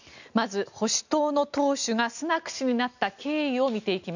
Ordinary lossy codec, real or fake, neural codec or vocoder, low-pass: none; real; none; 7.2 kHz